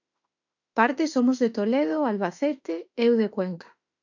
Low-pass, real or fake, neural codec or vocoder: 7.2 kHz; fake; autoencoder, 48 kHz, 32 numbers a frame, DAC-VAE, trained on Japanese speech